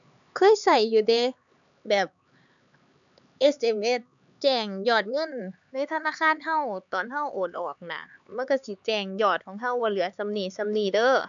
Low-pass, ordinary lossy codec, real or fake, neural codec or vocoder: 7.2 kHz; MP3, 96 kbps; fake; codec, 16 kHz, 4 kbps, X-Codec, HuBERT features, trained on LibriSpeech